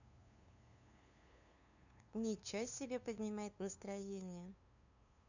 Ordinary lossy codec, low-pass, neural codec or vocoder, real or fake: none; 7.2 kHz; codec, 16 kHz, 2 kbps, FunCodec, trained on LibriTTS, 25 frames a second; fake